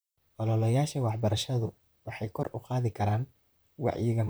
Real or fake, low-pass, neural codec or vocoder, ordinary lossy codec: fake; none; vocoder, 44.1 kHz, 128 mel bands, Pupu-Vocoder; none